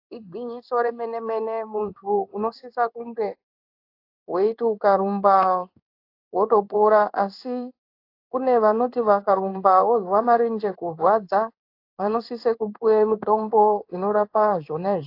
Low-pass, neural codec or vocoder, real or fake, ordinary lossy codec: 5.4 kHz; codec, 16 kHz in and 24 kHz out, 1 kbps, XY-Tokenizer; fake; AAC, 32 kbps